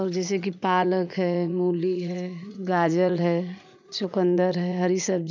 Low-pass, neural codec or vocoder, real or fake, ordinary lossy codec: 7.2 kHz; vocoder, 44.1 kHz, 80 mel bands, Vocos; fake; none